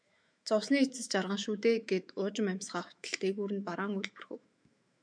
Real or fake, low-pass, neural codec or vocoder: fake; 9.9 kHz; autoencoder, 48 kHz, 128 numbers a frame, DAC-VAE, trained on Japanese speech